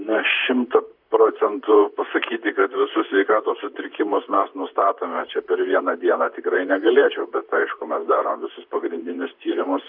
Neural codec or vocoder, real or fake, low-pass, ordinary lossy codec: vocoder, 44.1 kHz, 128 mel bands, Pupu-Vocoder; fake; 5.4 kHz; AAC, 48 kbps